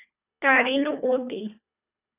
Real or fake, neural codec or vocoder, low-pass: fake; codec, 24 kHz, 1.5 kbps, HILCodec; 3.6 kHz